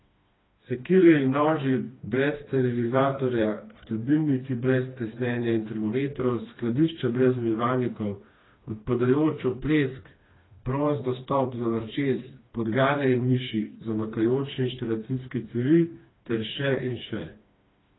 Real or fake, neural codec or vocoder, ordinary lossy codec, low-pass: fake; codec, 16 kHz, 2 kbps, FreqCodec, smaller model; AAC, 16 kbps; 7.2 kHz